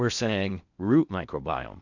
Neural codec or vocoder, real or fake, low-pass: codec, 16 kHz in and 24 kHz out, 0.6 kbps, FocalCodec, streaming, 4096 codes; fake; 7.2 kHz